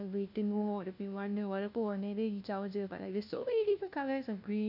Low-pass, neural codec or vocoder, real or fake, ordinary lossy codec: 5.4 kHz; codec, 16 kHz, 1 kbps, FunCodec, trained on LibriTTS, 50 frames a second; fake; none